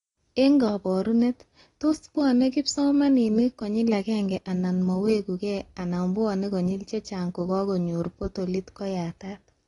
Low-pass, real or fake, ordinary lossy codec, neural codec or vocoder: 10.8 kHz; real; AAC, 32 kbps; none